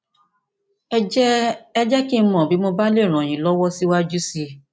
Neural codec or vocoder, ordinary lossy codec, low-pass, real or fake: none; none; none; real